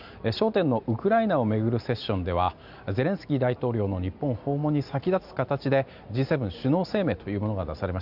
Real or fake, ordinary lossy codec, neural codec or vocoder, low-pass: real; none; none; 5.4 kHz